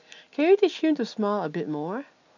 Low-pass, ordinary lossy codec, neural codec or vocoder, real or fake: 7.2 kHz; none; none; real